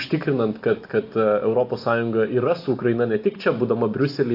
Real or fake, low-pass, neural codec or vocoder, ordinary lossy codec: real; 5.4 kHz; none; AAC, 32 kbps